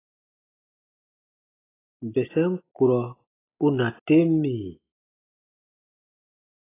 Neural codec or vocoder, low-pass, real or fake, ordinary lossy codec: none; 3.6 kHz; real; AAC, 16 kbps